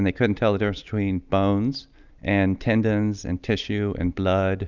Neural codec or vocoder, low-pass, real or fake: none; 7.2 kHz; real